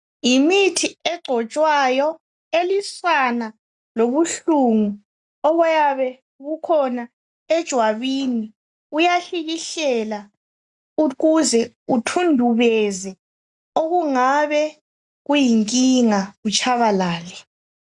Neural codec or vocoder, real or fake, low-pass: none; real; 10.8 kHz